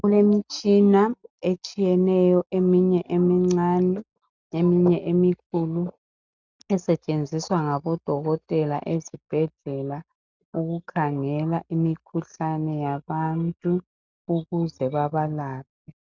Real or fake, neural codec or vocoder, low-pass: real; none; 7.2 kHz